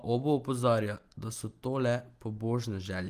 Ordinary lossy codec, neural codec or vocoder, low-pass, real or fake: Opus, 24 kbps; none; 14.4 kHz; real